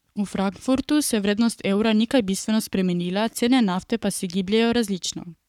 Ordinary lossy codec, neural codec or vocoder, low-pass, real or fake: none; codec, 44.1 kHz, 7.8 kbps, Pupu-Codec; 19.8 kHz; fake